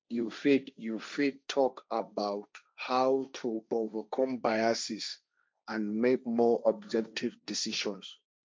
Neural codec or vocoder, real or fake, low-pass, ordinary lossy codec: codec, 16 kHz, 1.1 kbps, Voila-Tokenizer; fake; none; none